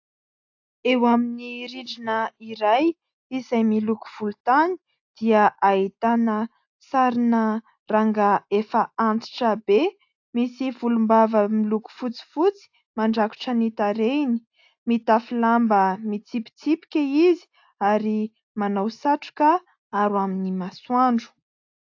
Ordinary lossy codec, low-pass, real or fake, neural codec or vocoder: AAC, 48 kbps; 7.2 kHz; real; none